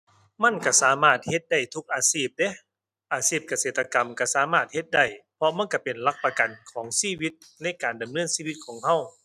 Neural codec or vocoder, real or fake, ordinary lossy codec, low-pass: none; real; none; 14.4 kHz